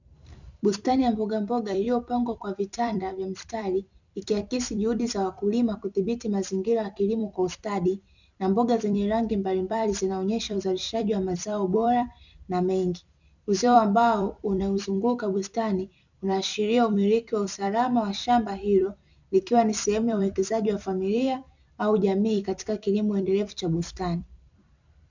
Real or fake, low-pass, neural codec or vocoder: fake; 7.2 kHz; vocoder, 44.1 kHz, 128 mel bands every 256 samples, BigVGAN v2